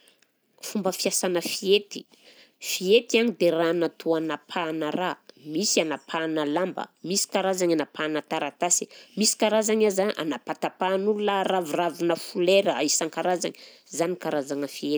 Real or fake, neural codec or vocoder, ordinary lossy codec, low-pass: real; none; none; none